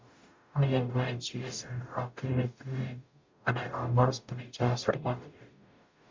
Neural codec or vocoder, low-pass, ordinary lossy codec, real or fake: codec, 44.1 kHz, 0.9 kbps, DAC; 7.2 kHz; MP3, 64 kbps; fake